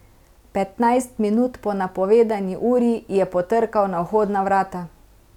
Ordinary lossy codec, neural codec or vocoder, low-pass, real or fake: none; none; 19.8 kHz; real